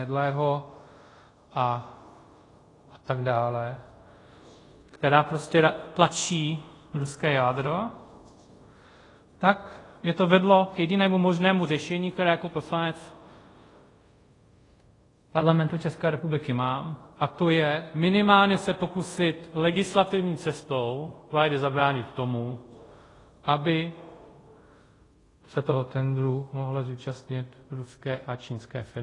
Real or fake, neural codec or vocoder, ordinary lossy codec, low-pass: fake; codec, 24 kHz, 0.5 kbps, DualCodec; AAC, 32 kbps; 10.8 kHz